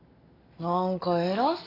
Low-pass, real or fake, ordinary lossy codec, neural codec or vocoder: 5.4 kHz; real; AAC, 24 kbps; none